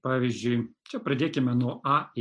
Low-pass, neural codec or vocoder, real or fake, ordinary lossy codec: 9.9 kHz; none; real; MP3, 64 kbps